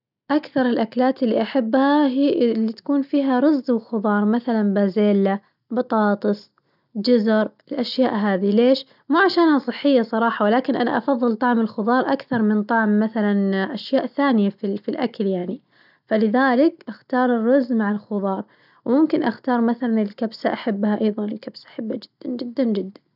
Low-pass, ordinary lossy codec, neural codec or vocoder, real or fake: 5.4 kHz; none; none; real